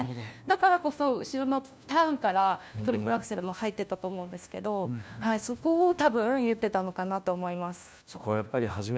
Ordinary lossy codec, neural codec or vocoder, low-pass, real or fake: none; codec, 16 kHz, 1 kbps, FunCodec, trained on LibriTTS, 50 frames a second; none; fake